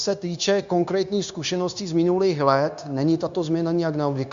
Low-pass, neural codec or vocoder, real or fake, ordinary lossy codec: 7.2 kHz; codec, 16 kHz, 0.9 kbps, LongCat-Audio-Codec; fake; Opus, 64 kbps